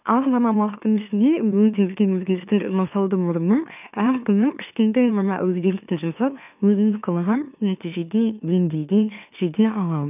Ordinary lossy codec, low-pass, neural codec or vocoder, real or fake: none; 3.6 kHz; autoencoder, 44.1 kHz, a latent of 192 numbers a frame, MeloTTS; fake